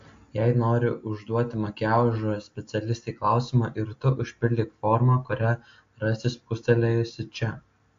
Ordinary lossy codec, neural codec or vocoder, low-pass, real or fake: AAC, 48 kbps; none; 7.2 kHz; real